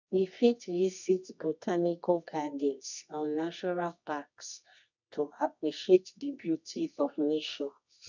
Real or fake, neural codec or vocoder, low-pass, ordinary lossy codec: fake; codec, 24 kHz, 0.9 kbps, WavTokenizer, medium music audio release; 7.2 kHz; none